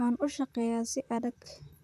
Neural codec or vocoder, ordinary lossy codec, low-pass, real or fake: vocoder, 44.1 kHz, 128 mel bands, Pupu-Vocoder; none; 14.4 kHz; fake